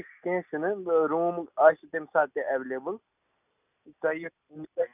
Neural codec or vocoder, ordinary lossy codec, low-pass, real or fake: none; none; 3.6 kHz; real